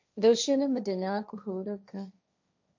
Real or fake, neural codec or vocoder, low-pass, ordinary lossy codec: fake; codec, 16 kHz, 1.1 kbps, Voila-Tokenizer; 7.2 kHz; none